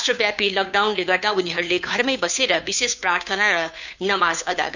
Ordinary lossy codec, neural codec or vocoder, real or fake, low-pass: none; codec, 16 kHz, 2 kbps, FunCodec, trained on Chinese and English, 25 frames a second; fake; 7.2 kHz